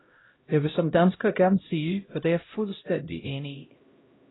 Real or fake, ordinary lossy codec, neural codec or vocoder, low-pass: fake; AAC, 16 kbps; codec, 16 kHz, 0.5 kbps, X-Codec, HuBERT features, trained on LibriSpeech; 7.2 kHz